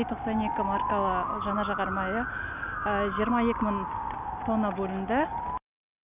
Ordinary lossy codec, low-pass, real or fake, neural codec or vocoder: none; 3.6 kHz; real; none